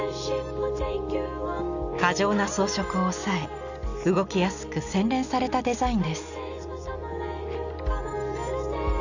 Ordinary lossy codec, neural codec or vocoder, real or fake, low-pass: none; none; real; 7.2 kHz